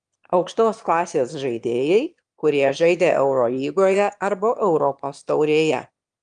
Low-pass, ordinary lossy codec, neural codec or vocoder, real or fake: 9.9 kHz; Opus, 32 kbps; autoencoder, 22.05 kHz, a latent of 192 numbers a frame, VITS, trained on one speaker; fake